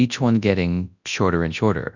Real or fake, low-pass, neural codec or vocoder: fake; 7.2 kHz; codec, 24 kHz, 0.9 kbps, WavTokenizer, large speech release